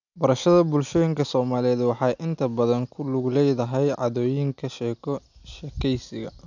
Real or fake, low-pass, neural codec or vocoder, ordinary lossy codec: real; 7.2 kHz; none; none